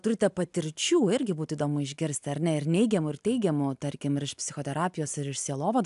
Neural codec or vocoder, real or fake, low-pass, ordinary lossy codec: none; real; 10.8 kHz; AAC, 96 kbps